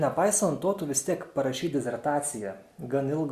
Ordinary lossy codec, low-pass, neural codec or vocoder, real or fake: Opus, 64 kbps; 14.4 kHz; none; real